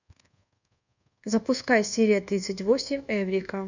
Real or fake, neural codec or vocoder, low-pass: fake; codec, 24 kHz, 1.2 kbps, DualCodec; 7.2 kHz